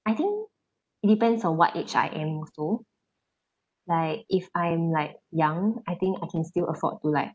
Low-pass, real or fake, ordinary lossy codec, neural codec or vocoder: none; real; none; none